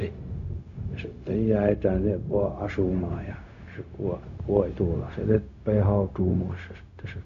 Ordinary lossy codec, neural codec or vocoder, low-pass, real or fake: none; codec, 16 kHz, 0.4 kbps, LongCat-Audio-Codec; 7.2 kHz; fake